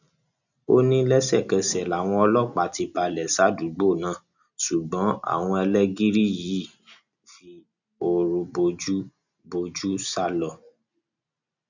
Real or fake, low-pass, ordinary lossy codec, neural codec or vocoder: real; 7.2 kHz; none; none